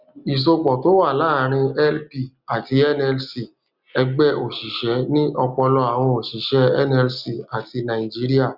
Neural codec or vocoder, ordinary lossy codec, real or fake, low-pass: none; Opus, 64 kbps; real; 5.4 kHz